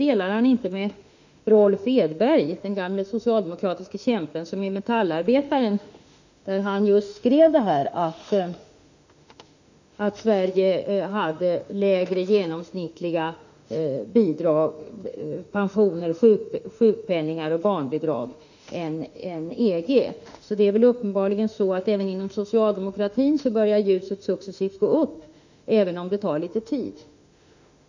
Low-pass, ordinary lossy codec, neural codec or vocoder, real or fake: 7.2 kHz; none; autoencoder, 48 kHz, 32 numbers a frame, DAC-VAE, trained on Japanese speech; fake